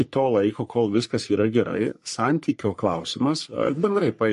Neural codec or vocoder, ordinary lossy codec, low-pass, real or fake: codec, 44.1 kHz, 3.4 kbps, Pupu-Codec; MP3, 48 kbps; 14.4 kHz; fake